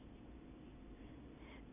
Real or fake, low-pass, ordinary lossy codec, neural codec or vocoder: real; 3.6 kHz; none; none